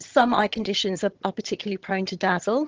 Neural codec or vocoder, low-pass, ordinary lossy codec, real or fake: vocoder, 22.05 kHz, 80 mel bands, HiFi-GAN; 7.2 kHz; Opus, 16 kbps; fake